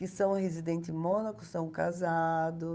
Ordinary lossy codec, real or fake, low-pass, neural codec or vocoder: none; fake; none; codec, 16 kHz, 8 kbps, FunCodec, trained on Chinese and English, 25 frames a second